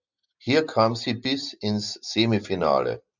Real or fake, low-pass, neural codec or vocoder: real; 7.2 kHz; none